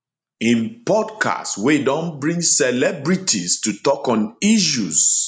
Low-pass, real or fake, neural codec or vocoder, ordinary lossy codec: 9.9 kHz; real; none; none